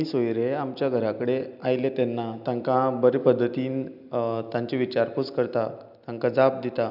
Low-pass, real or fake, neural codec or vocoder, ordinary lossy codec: 5.4 kHz; real; none; none